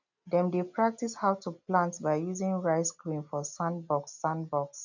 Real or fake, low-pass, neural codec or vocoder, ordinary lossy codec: real; 7.2 kHz; none; none